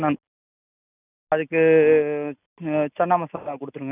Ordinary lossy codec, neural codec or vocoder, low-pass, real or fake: none; none; 3.6 kHz; real